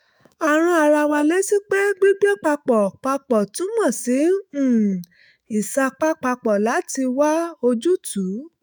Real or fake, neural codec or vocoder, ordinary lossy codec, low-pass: fake; autoencoder, 48 kHz, 128 numbers a frame, DAC-VAE, trained on Japanese speech; none; none